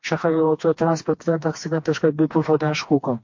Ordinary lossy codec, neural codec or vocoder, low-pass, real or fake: MP3, 48 kbps; codec, 16 kHz, 2 kbps, FreqCodec, smaller model; 7.2 kHz; fake